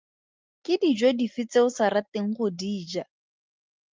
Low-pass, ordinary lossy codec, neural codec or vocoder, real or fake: 7.2 kHz; Opus, 32 kbps; none; real